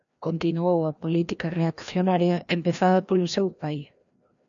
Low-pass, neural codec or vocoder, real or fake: 7.2 kHz; codec, 16 kHz, 1 kbps, FreqCodec, larger model; fake